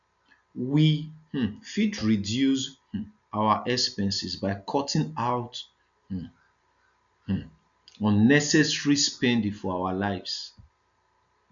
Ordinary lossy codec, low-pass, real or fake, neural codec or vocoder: none; 7.2 kHz; real; none